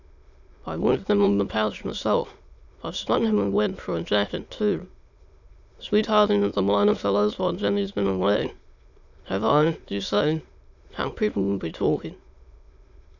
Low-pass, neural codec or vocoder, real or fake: 7.2 kHz; autoencoder, 22.05 kHz, a latent of 192 numbers a frame, VITS, trained on many speakers; fake